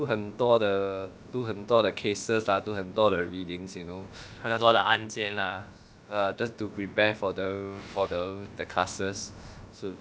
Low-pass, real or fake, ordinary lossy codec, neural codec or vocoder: none; fake; none; codec, 16 kHz, about 1 kbps, DyCAST, with the encoder's durations